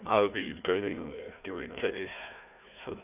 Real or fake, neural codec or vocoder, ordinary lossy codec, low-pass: fake; codec, 16 kHz, 1 kbps, FunCodec, trained on Chinese and English, 50 frames a second; none; 3.6 kHz